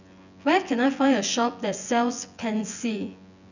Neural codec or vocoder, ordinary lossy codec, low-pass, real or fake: vocoder, 24 kHz, 100 mel bands, Vocos; none; 7.2 kHz; fake